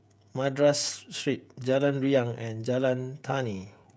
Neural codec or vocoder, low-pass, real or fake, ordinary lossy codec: codec, 16 kHz, 16 kbps, FreqCodec, smaller model; none; fake; none